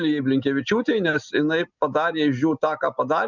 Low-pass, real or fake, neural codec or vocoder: 7.2 kHz; real; none